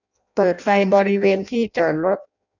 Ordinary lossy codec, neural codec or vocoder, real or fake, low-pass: none; codec, 16 kHz in and 24 kHz out, 0.6 kbps, FireRedTTS-2 codec; fake; 7.2 kHz